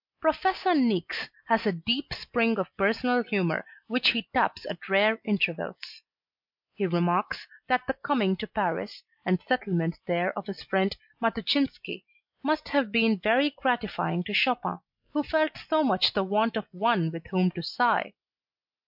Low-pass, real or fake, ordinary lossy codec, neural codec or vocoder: 5.4 kHz; real; MP3, 48 kbps; none